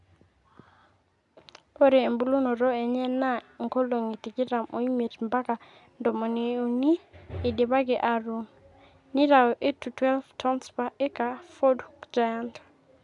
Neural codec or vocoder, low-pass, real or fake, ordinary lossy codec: none; 10.8 kHz; real; none